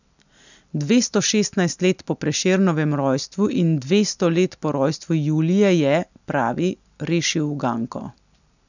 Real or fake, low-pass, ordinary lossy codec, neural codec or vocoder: real; 7.2 kHz; none; none